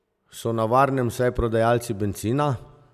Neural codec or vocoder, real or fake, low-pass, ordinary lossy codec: none; real; 14.4 kHz; none